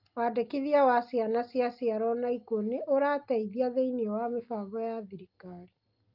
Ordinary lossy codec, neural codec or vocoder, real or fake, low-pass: Opus, 24 kbps; none; real; 5.4 kHz